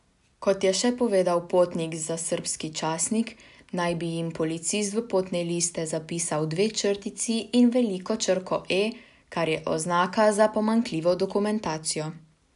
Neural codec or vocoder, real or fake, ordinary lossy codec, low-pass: none; real; none; 10.8 kHz